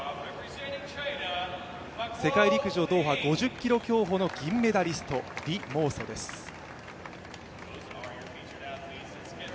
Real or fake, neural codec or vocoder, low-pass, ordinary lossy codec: real; none; none; none